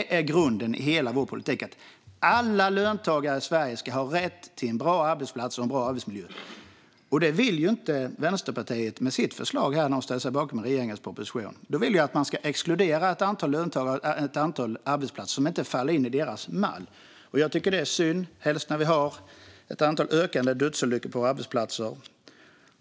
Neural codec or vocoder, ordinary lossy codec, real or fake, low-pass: none; none; real; none